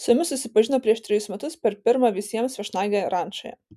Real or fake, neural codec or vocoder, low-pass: real; none; 14.4 kHz